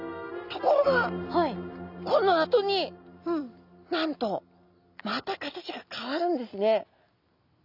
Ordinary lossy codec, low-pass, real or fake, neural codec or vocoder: none; 5.4 kHz; real; none